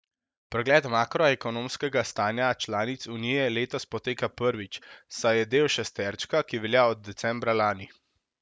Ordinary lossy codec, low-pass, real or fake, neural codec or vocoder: none; none; real; none